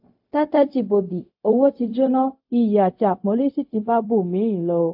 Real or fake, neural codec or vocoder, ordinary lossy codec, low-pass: fake; codec, 16 kHz, 0.4 kbps, LongCat-Audio-Codec; AAC, 48 kbps; 5.4 kHz